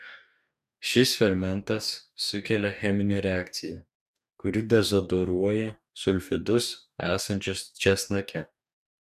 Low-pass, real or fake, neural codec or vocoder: 14.4 kHz; fake; codec, 44.1 kHz, 2.6 kbps, DAC